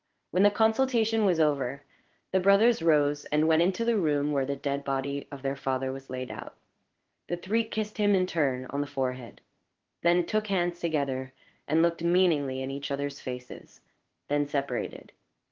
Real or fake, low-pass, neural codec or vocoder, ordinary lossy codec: fake; 7.2 kHz; codec, 16 kHz in and 24 kHz out, 1 kbps, XY-Tokenizer; Opus, 16 kbps